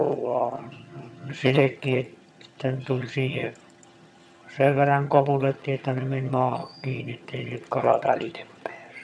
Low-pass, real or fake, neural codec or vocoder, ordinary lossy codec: none; fake; vocoder, 22.05 kHz, 80 mel bands, HiFi-GAN; none